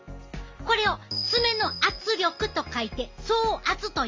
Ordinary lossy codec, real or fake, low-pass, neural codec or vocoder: Opus, 32 kbps; real; 7.2 kHz; none